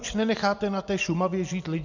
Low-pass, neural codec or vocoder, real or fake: 7.2 kHz; none; real